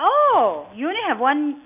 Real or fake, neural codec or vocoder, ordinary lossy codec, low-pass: real; none; none; 3.6 kHz